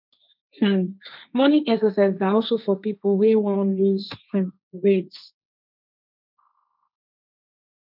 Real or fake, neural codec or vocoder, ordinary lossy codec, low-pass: fake; codec, 16 kHz, 1.1 kbps, Voila-Tokenizer; none; 5.4 kHz